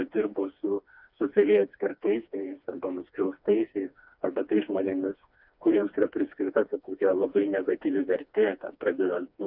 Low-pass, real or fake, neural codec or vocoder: 5.4 kHz; fake; codec, 16 kHz, 2 kbps, FreqCodec, smaller model